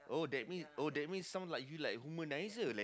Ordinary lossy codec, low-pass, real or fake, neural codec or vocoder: none; none; real; none